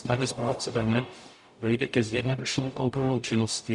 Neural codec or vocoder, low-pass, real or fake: codec, 44.1 kHz, 0.9 kbps, DAC; 10.8 kHz; fake